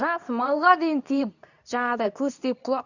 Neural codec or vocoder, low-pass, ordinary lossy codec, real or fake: codec, 24 kHz, 0.9 kbps, WavTokenizer, medium speech release version 2; 7.2 kHz; none; fake